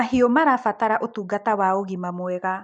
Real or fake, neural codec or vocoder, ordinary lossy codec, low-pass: real; none; none; 9.9 kHz